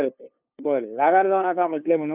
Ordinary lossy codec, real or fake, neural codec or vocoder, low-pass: none; fake; codec, 16 kHz, 2 kbps, FunCodec, trained on LibriTTS, 25 frames a second; 3.6 kHz